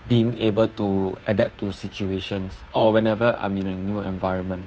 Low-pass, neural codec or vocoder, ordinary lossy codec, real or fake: none; codec, 16 kHz, 2 kbps, FunCodec, trained on Chinese and English, 25 frames a second; none; fake